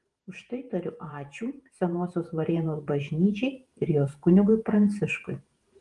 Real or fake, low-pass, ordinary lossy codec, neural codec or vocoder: fake; 10.8 kHz; Opus, 24 kbps; vocoder, 48 kHz, 128 mel bands, Vocos